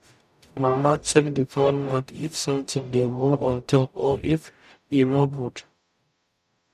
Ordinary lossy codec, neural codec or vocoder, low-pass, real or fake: none; codec, 44.1 kHz, 0.9 kbps, DAC; 14.4 kHz; fake